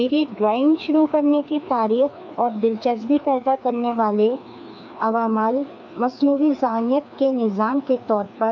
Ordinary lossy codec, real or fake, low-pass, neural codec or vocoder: MP3, 64 kbps; fake; 7.2 kHz; codec, 16 kHz, 2 kbps, FreqCodec, larger model